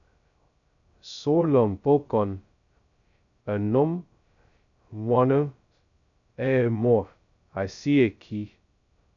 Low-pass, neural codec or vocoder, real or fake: 7.2 kHz; codec, 16 kHz, 0.2 kbps, FocalCodec; fake